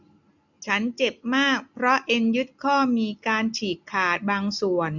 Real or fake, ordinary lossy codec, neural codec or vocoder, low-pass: real; none; none; 7.2 kHz